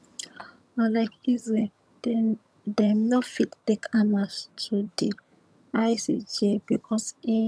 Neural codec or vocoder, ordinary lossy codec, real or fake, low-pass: vocoder, 22.05 kHz, 80 mel bands, HiFi-GAN; none; fake; none